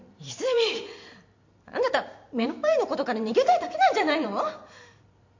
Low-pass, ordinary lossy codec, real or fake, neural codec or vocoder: 7.2 kHz; MP3, 48 kbps; fake; vocoder, 44.1 kHz, 128 mel bands every 512 samples, BigVGAN v2